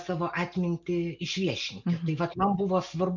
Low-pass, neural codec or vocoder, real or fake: 7.2 kHz; none; real